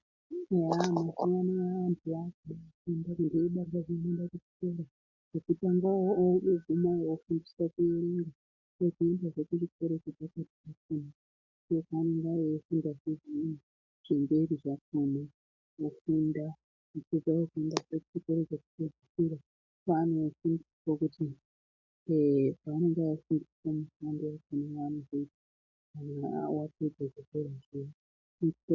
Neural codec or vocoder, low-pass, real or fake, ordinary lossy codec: none; 7.2 kHz; real; MP3, 64 kbps